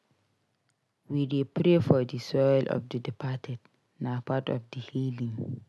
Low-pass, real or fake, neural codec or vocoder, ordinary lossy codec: none; real; none; none